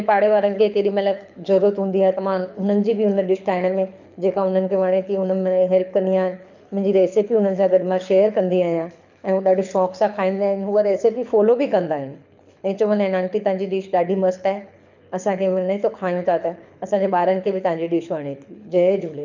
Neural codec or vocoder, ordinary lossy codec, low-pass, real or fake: codec, 24 kHz, 6 kbps, HILCodec; none; 7.2 kHz; fake